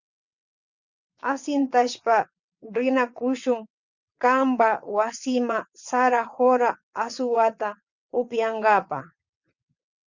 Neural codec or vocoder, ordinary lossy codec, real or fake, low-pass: vocoder, 22.05 kHz, 80 mel bands, WaveNeXt; Opus, 64 kbps; fake; 7.2 kHz